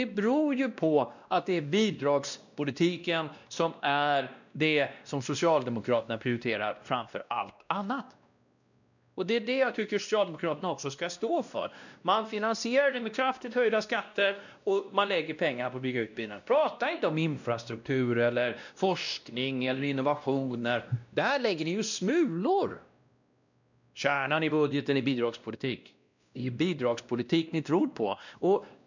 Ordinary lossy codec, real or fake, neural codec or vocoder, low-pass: none; fake; codec, 16 kHz, 1 kbps, X-Codec, WavLM features, trained on Multilingual LibriSpeech; 7.2 kHz